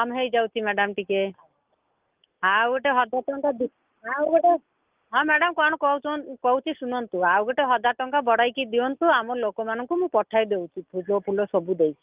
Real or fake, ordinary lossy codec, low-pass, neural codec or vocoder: real; Opus, 24 kbps; 3.6 kHz; none